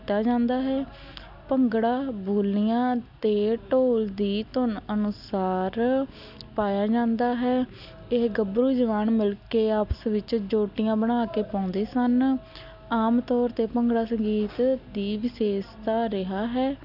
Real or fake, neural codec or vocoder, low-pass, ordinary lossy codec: real; none; 5.4 kHz; none